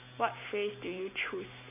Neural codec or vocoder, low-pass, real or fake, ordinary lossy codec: none; 3.6 kHz; real; none